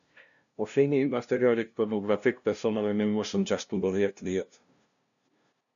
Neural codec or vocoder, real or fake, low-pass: codec, 16 kHz, 0.5 kbps, FunCodec, trained on LibriTTS, 25 frames a second; fake; 7.2 kHz